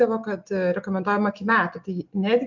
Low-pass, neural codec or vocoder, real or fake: 7.2 kHz; none; real